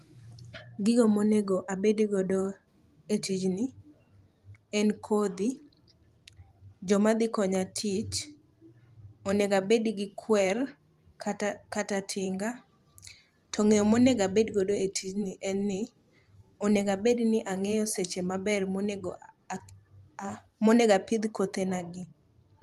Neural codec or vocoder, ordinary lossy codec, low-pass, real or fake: vocoder, 44.1 kHz, 128 mel bands every 512 samples, BigVGAN v2; Opus, 32 kbps; 14.4 kHz; fake